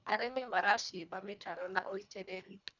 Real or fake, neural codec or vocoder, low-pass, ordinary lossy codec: fake; codec, 24 kHz, 1.5 kbps, HILCodec; 7.2 kHz; none